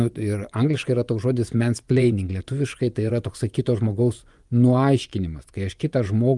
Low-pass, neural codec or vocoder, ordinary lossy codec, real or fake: 10.8 kHz; vocoder, 24 kHz, 100 mel bands, Vocos; Opus, 32 kbps; fake